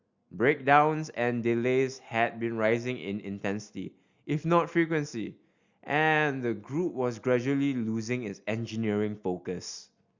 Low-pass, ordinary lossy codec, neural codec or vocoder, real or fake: 7.2 kHz; Opus, 64 kbps; none; real